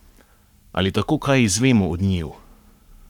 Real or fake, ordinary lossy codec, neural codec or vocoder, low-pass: fake; none; codec, 44.1 kHz, 7.8 kbps, Pupu-Codec; 19.8 kHz